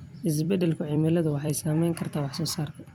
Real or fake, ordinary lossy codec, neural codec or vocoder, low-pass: fake; none; vocoder, 44.1 kHz, 128 mel bands every 256 samples, BigVGAN v2; 19.8 kHz